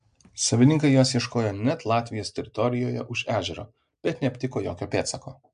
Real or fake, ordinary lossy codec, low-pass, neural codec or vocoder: real; MP3, 64 kbps; 9.9 kHz; none